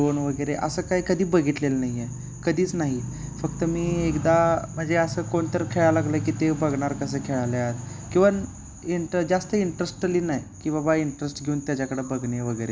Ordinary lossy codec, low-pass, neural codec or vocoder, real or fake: none; none; none; real